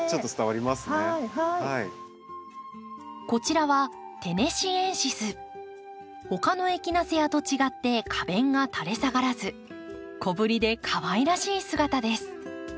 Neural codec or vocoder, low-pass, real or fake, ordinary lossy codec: none; none; real; none